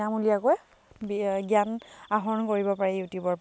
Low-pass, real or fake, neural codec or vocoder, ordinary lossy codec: none; real; none; none